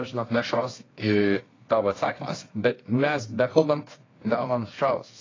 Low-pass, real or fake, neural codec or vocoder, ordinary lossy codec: 7.2 kHz; fake; codec, 24 kHz, 0.9 kbps, WavTokenizer, medium music audio release; AAC, 32 kbps